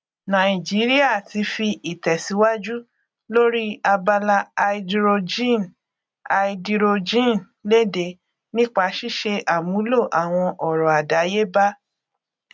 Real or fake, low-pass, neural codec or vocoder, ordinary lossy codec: real; none; none; none